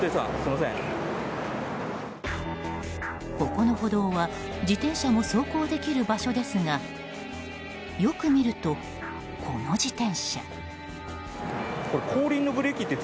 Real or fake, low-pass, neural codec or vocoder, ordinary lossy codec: real; none; none; none